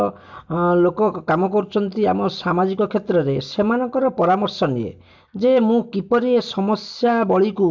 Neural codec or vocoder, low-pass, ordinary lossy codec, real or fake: none; 7.2 kHz; MP3, 64 kbps; real